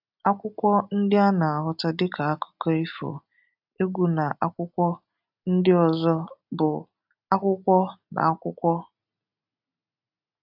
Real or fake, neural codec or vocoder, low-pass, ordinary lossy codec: real; none; 5.4 kHz; AAC, 48 kbps